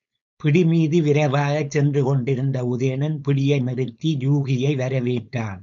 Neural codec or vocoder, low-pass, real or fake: codec, 16 kHz, 4.8 kbps, FACodec; 7.2 kHz; fake